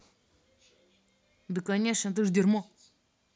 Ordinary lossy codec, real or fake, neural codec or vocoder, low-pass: none; real; none; none